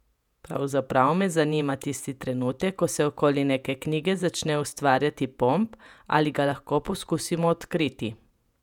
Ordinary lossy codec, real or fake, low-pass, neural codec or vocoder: none; fake; 19.8 kHz; vocoder, 48 kHz, 128 mel bands, Vocos